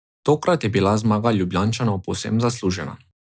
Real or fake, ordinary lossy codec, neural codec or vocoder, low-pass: real; none; none; none